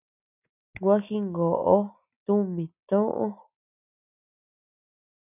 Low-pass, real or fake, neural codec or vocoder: 3.6 kHz; real; none